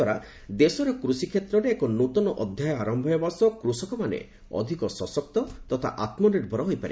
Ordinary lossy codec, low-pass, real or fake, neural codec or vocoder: none; none; real; none